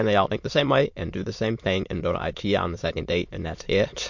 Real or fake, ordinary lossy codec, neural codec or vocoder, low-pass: fake; MP3, 48 kbps; autoencoder, 22.05 kHz, a latent of 192 numbers a frame, VITS, trained on many speakers; 7.2 kHz